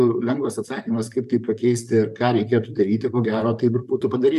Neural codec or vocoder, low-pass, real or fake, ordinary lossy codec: vocoder, 44.1 kHz, 128 mel bands, Pupu-Vocoder; 14.4 kHz; fake; MP3, 96 kbps